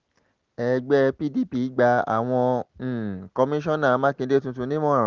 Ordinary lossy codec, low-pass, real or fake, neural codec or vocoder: Opus, 16 kbps; 7.2 kHz; real; none